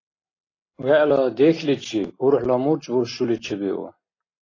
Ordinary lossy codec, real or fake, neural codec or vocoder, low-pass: AAC, 32 kbps; real; none; 7.2 kHz